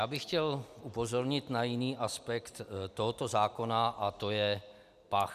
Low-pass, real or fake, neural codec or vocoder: 14.4 kHz; real; none